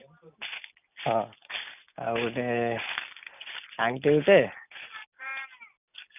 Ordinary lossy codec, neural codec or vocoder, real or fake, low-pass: none; none; real; 3.6 kHz